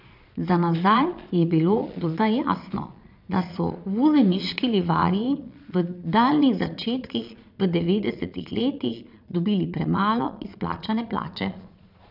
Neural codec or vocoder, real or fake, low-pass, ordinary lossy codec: vocoder, 22.05 kHz, 80 mel bands, Vocos; fake; 5.4 kHz; none